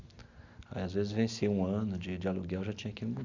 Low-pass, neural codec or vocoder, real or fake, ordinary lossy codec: 7.2 kHz; none; real; Opus, 64 kbps